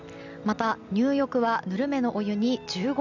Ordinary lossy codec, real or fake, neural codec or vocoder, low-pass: none; real; none; 7.2 kHz